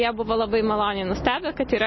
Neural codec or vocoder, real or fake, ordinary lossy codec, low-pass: none; real; MP3, 24 kbps; 7.2 kHz